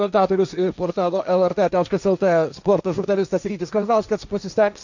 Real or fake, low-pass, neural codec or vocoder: fake; 7.2 kHz; codec, 16 kHz, 1.1 kbps, Voila-Tokenizer